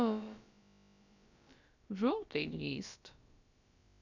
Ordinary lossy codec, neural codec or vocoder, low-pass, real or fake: none; codec, 16 kHz, about 1 kbps, DyCAST, with the encoder's durations; 7.2 kHz; fake